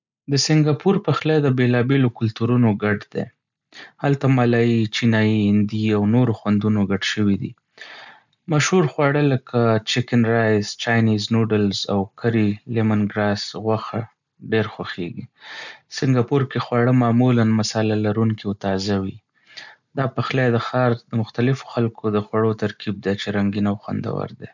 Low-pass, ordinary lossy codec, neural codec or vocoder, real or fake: 7.2 kHz; none; none; real